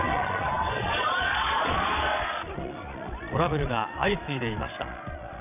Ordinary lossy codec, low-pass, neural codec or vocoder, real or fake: none; 3.6 kHz; vocoder, 22.05 kHz, 80 mel bands, Vocos; fake